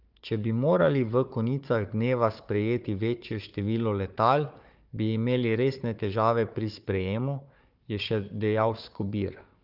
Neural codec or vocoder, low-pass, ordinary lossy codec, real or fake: codec, 16 kHz, 4 kbps, FunCodec, trained on Chinese and English, 50 frames a second; 5.4 kHz; Opus, 24 kbps; fake